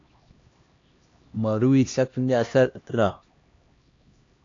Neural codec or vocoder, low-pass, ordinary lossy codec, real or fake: codec, 16 kHz, 1 kbps, X-Codec, HuBERT features, trained on LibriSpeech; 7.2 kHz; AAC, 48 kbps; fake